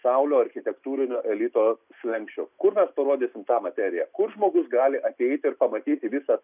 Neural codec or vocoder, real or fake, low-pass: none; real; 3.6 kHz